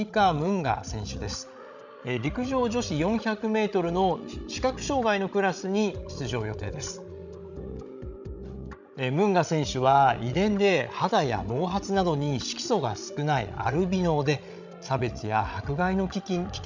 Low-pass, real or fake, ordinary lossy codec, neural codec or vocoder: 7.2 kHz; fake; none; codec, 16 kHz, 16 kbps, FreqCodec, larger model